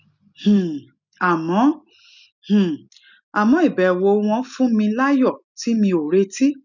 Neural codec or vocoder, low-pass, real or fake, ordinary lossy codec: none; 7.2 kHz; real; none